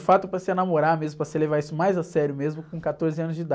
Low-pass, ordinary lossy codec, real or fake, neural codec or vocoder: none; none; real; none